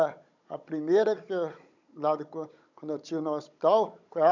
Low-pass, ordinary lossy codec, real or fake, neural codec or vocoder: 7.2 kHz; none; fake; codec, 16 kHz, 16 kbps, FunCodec, trained on Chinese and English, 50 frames a second